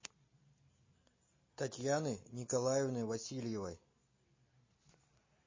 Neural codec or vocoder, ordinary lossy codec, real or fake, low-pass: none; MP3, 32 kbps; real; 7.2 kHz